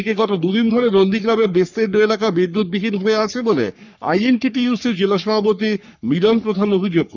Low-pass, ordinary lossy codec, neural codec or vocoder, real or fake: 7.2 kHz; none; codec, 44.1 kHz, 3.4 kbps, Pupu-Codec; fake